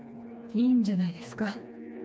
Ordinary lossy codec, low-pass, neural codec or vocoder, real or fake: none; none; codec, 16 kHz, 2 kbps, FreqCodec, smaller model; fake